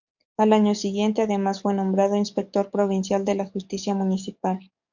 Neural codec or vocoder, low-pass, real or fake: codec, 44.1 kHz, 7.8 kbps, DAC; 7.2 kHz; fake